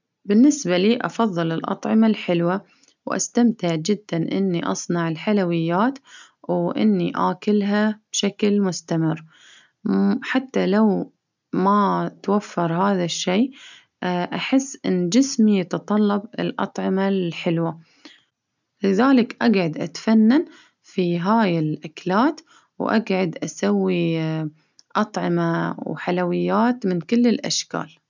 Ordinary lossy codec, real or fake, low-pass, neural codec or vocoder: none; real; 7.2 kHz; none